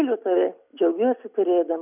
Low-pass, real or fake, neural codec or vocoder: 3.6 kHz; real; none